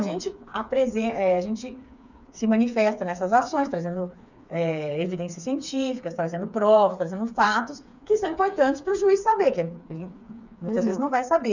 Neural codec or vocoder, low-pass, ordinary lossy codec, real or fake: codec, 16 kHz, 4 kbps, FreqCodec, smaller model; 7.2 kHz; none; fake